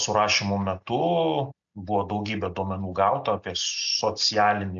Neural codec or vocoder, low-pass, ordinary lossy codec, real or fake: none; 7.2 kHz; AAC, 64 kbps; real